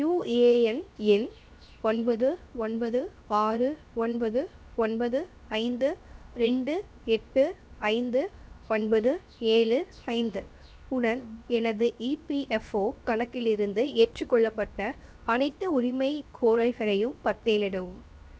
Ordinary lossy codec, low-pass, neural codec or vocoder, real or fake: none; none; codec, 16 kHz, 0.7 kbps, FocalCodec; fake